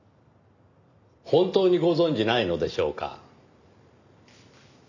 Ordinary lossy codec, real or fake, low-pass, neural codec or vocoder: none; real; 7.2 kHz; none